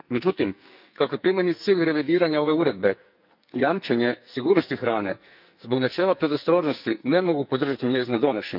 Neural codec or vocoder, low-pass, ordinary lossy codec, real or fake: codec, 44.1 kHz, 2.6 kbps, SNAC; 5.4 kHz; none; fake